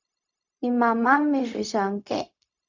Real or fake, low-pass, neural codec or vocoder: fake; 7.2 kHz; codec, 16 kHz, 0.4 kbps, LongCat-Audio-Codec